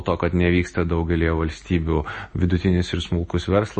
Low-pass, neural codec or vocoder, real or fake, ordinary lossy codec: 10.8 kHz; none; real; MP3, 32 kbps